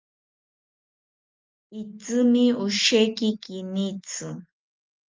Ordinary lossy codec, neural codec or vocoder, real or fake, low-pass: Opus, 32 kbps; none; real; 7.2 kHz